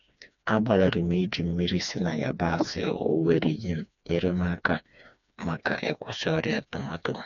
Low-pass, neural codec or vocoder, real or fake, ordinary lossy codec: 7.2 kHz; codec, 16 kHz, 2 kbps, FreqCodec, smaller model; fake; none